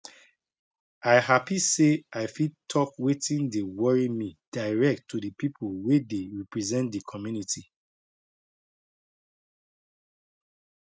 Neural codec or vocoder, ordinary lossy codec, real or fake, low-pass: none; none; real; none